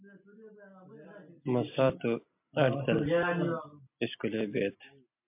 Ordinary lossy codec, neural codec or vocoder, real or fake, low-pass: MP3, 32 kbps; none; real; 3.6 kHz